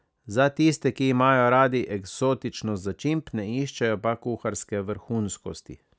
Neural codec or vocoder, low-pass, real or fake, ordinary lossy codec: none; none; real; none